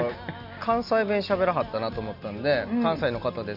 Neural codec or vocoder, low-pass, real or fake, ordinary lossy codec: none; 5.4 kHz; real; none